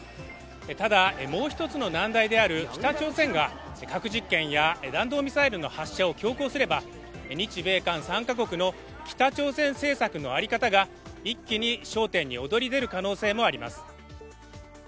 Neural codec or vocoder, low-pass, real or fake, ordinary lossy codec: none; none; real; none